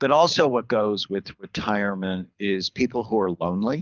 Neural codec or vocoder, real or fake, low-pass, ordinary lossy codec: codec, 24 kHz, 6 kbps, HILCodec; fake; 7.2 kHz; Opus, 24 kbps